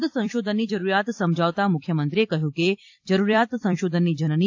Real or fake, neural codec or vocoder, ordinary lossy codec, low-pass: fake; vocoder, 44.1 kHz, 128 mel bands every 256 samples, BigVGAN v2; AAC, 48 kbps; 7.2 kHz